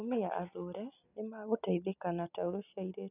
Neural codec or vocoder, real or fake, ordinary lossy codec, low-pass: none; real; none; 3.6 kHz